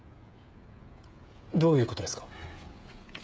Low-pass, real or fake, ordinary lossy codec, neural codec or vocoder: none; fake; none; codec, 16 kHz, 16 kbps, FreqCodec, smaller model